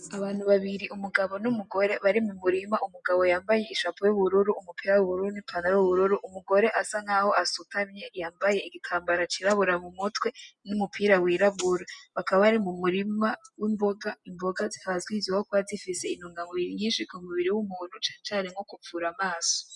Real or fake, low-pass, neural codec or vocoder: real; 10.8 kHz; none